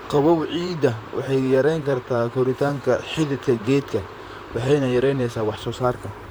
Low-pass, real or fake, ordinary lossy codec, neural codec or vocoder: none; fake; none; vocoder, 44.1 kHz, 128 mel bands, Pupu-Vocoder